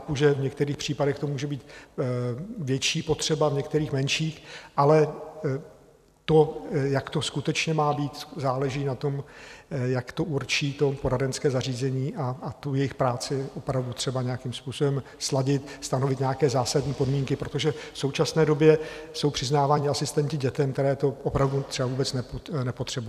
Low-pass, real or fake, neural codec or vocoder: 14.4 kHz; fake; vocoder, 44.1 kHz, 128 mel bands every 256 samples, BigVGAN v2